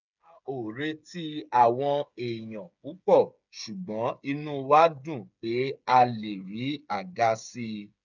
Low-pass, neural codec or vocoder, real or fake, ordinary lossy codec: 7.2 kHz; codec, 16 kHz, 8 kbps, FreqCodec, smaller model; fake; none